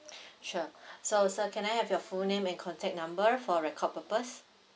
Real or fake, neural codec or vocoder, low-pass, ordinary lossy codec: real; none; none; none